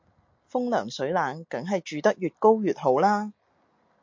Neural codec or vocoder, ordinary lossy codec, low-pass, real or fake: none; MP3, 48 kbps; 7.2 kHz; real